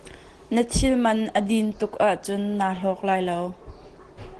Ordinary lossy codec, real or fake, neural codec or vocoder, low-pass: Opus, 16 kbps; real; none; 10.8 kHz